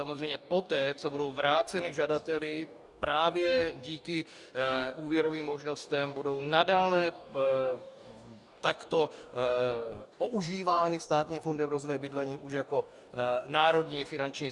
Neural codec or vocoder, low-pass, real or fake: codec, 44.1 kHz, 2.6 kbps, DAC; 10.8 kHz; fake